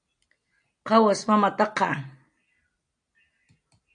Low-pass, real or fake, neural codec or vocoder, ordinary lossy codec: 9.9 kHz; real; none; AAC, 64 kbps